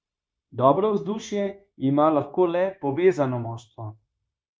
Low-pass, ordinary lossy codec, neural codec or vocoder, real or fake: none; none; codec, 16 kHz, 0.9 kbps, LongCat-Audio-Codec; fake